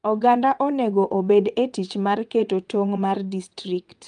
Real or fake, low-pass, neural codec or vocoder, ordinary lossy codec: fake; 9.9 kHz; vocoder, 22.05 kHz, 80 mel bands, WaveNeXt; Opus, 32 kbps